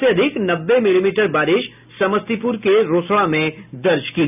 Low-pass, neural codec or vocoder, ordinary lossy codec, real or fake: 3.6 kHz; none; none; real